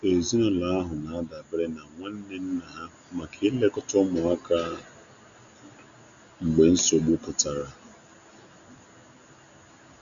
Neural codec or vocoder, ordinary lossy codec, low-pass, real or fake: none; none; 7.2 kHz; real